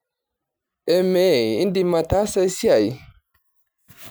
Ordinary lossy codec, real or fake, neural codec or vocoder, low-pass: none; real; none; none